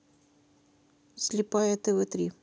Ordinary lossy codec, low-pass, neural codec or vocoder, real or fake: none; none; none; real